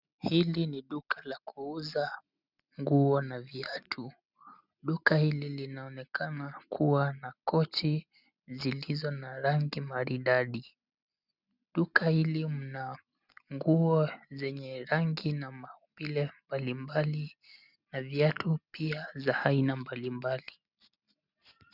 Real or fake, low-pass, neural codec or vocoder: real; 5.4 kHz; none